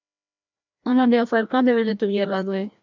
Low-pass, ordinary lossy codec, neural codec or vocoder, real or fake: 7.2 kHz; MP3, 64 kbps; codec, 16 kHz, 1 kbps, FreqCodec, larger model; fake